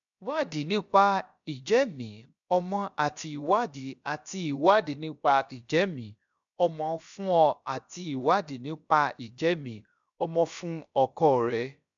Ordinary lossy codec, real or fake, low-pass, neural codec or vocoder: none; fake; 7.2 kHz; codec, 16 kHz, about 1 kbps, DyCAST, with the encoder's durations